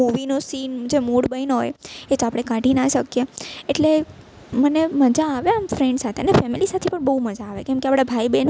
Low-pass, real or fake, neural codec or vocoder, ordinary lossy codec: none; real; none; none